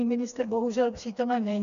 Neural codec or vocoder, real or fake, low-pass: codec, 16 kHz, 2 kbps, FreqCodec, smaller model; fake; 7.2 kHz